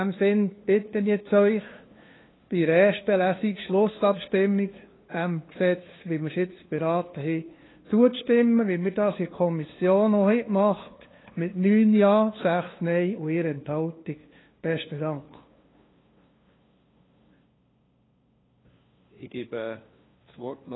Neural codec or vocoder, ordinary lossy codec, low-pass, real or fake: codec, 16 kHz, 2 kbps, FunCodec, trained on LibriTTS, 25 frames a second; AAC, 16 kbps; 7.2 kHz; fake